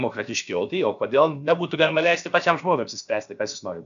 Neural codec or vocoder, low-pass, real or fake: codec, 16 kHz, about 1 kbps, DyCAST, with the encoder's durations; 7.2 kHz; fake